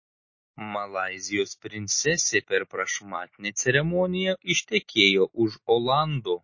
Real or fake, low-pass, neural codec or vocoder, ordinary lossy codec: real; 7.2 kHz; none; MP3, 32 kbps